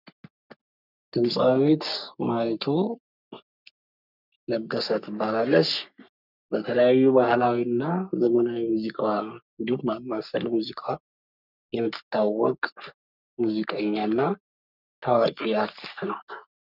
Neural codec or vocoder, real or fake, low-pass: codec, 44.1 kHz, 3.4 kbps, Pupu-Codec; fake; 5.4 kHz